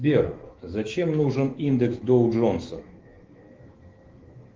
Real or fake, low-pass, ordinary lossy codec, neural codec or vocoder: fake; 7.2 kHz; Opus, 16 kbps; autoencoder, 48 kHz, 128 numbers a frame, DAC-VAE, trained on Japanese speech